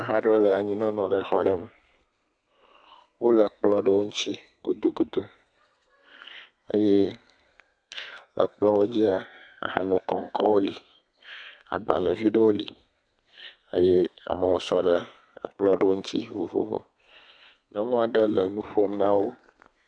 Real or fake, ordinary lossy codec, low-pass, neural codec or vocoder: fake; AAC, 64 kbps; 9.9 kHz; codec, 32 kHz, 1.9 kbps, SNAC